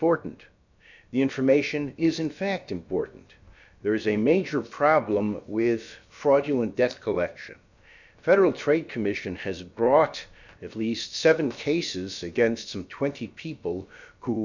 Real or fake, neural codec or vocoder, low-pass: fake; codec, 16 kHz, about 1 kbps, DyCAST, with the encoder's durations; 7.2 kHz